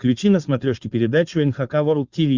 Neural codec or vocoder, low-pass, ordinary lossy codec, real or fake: codec, 16 kHz, 4.8 kbps, FACodec; 7.2 kHz; Opus, 64 kbps; fake